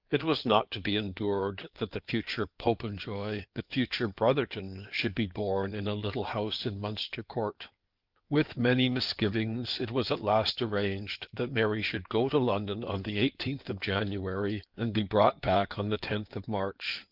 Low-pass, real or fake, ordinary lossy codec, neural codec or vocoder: 5.4 kHz; fake; Opus, 32 kbps; codec, 16 kHz in and 24 kHz out, 2.2 kbps, FireRedTTS-2 codec